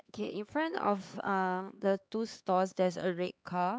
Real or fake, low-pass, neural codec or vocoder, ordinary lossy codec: fake; none; codec, 16 kHz, 4 kbps, X-Codec, HuBERT features, trained on LibriSpeech; none